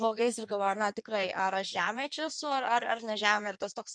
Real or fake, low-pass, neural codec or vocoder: fake; 9.9 kHz; codec, 16 kHz in and 24 kHz out, 1.1 kbps, FireRedTTS-2 codec